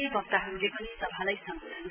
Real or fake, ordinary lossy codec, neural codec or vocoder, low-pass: real; none; none; 3.6 kHz